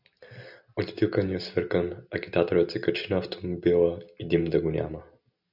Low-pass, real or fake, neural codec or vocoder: 5.4 kHz; real; none